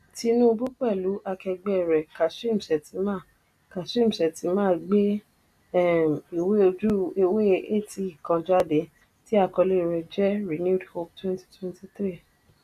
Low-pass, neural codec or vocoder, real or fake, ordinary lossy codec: 14.4 kHz; none; real; none